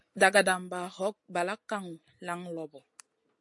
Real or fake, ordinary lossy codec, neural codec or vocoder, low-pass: real; MP3, 48 kbps; none; 10.8 kHz